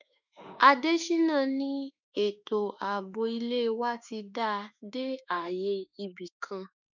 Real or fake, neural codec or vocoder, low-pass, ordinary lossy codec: fake; autoencoder, 48 kHz, 32 numbers a frame, DAC-VAE, trained on Japanese speech; 7.2 kHz; none